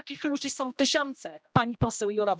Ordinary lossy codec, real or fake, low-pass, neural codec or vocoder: none; fake; none; codec, 16 kHz, 1 kbps, X-Codec, HuBERT features, trained on general audio